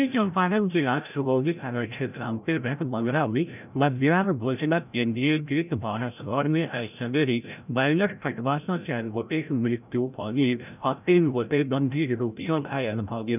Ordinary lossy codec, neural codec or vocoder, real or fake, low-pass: none; codec, 16 kHz, 0.5 kbps, FreqCodec, larger model; fake; 3.6 kHz